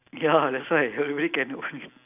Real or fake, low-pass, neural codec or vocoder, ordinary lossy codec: real; 3.6 kHz; none; none